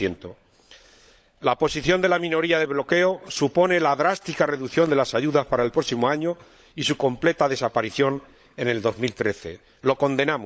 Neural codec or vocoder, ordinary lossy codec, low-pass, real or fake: codec, 16 kHz, 16 kbps, FunCodec, trained on LibriTTS, 50 frames a second; none; none; fake